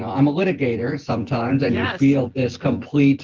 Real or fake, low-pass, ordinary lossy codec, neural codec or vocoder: fake; 7.2 kHz; Opus, 16 kbps; vocoder, 24 kHz, 100 mel bands, Vocos